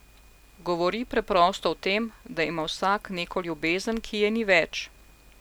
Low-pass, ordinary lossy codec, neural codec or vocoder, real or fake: none; none; none; real